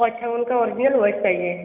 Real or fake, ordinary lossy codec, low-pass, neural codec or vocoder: real; none; 3.6 kHz; none